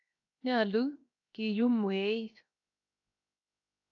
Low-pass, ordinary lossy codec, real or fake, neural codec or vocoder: 7.2 kHz; AAC, 48 kbps; fake; codec, 16 kHz, 0.7 kbps, FocalCodec